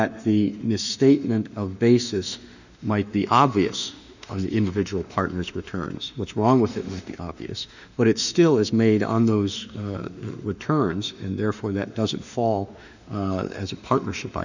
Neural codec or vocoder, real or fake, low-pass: autoencoder, 48 kHz, 32 numbers a frame, DAC-VAE, trained on Japanese speech; fake; 7.2 kHz